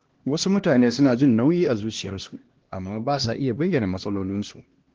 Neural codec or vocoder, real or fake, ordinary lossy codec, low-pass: codec, 16 kHz, 1 kbps, X-Codec, HuBERT features, trained on LibriSpeech; fake; Opus, 16 kbps; 7.2 kHz